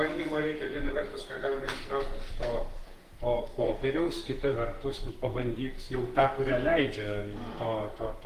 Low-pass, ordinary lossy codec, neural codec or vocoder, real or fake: 14.4 kHz; Opus, 24 kbps; codec, 44.1 kHz, 2.6 kbps, SNAC; fake